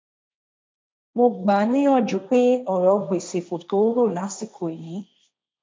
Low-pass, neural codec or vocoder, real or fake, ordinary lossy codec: none; codec, 16 kHz, 1.1 kbps, Voila-Tokenizer; fake; none